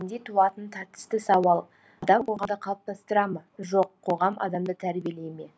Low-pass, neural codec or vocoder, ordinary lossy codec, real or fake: none; none; none; real